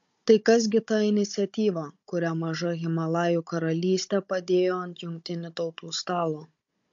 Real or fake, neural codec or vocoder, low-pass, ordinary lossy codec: fake; codec, 16 kHz, 16 kbps, FunCodec, trained on Chinese and English, 50 frames a second; 7.2 kHz; MP3, 48 kbps